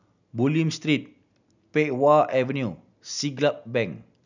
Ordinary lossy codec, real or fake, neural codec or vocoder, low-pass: none; real; none; 7.2 kHz